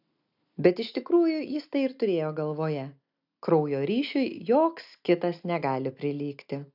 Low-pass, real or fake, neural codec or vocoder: 5.4 kHz; real; none